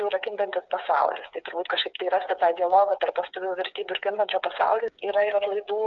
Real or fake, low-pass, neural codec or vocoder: fake; 7.2 kHz; codec, 16 kHz, 8 kbps, FreqCodec, smaller model